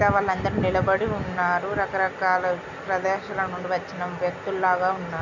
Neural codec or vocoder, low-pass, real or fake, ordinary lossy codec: none; 7.2 kHz; real; none